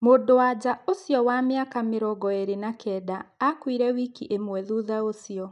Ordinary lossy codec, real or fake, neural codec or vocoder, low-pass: none; real; none; 10.8 kHz